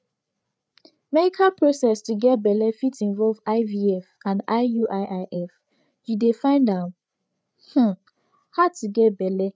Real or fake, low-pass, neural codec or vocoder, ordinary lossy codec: fake; none; codec, 16 kHz, 8 kbps, FreqCodec, larger model; none